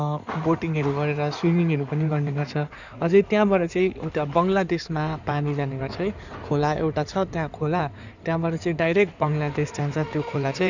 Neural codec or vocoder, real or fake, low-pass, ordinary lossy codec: codec, 16 kHz in and 24 kHz out, 2.2 kbps, FireRedTTS-2 codec; fake; 7.2 kHz; none